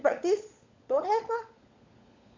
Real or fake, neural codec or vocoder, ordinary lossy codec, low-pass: fake; codec, 16 kHz, 16 kbps, FunCodec, trained on LibriTTS, 50 frames a second; MP3, 64 kbps; 7.2 kHz